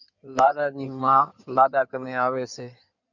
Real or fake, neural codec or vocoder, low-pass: fake; codec, 16 kHz in and 24 kHz out, 2.2 kbps, FireRedTTS-2 codec; 7.2 kHz